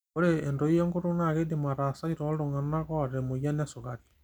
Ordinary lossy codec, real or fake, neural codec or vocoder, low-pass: none; real; none; none